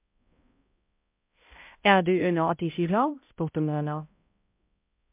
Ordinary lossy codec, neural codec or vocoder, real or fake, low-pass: MP3, 32 kbps; codec, 16 kHz, 0.5 kbps, X-Codec, HuBERT features, trained on balanced general audio; fake; 3.6 kHz